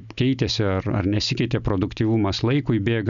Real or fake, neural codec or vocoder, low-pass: real; none; 7.2 kHz